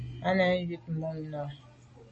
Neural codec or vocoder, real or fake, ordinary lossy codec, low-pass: none; real; MP3, 32 kbps; 10.8 kHz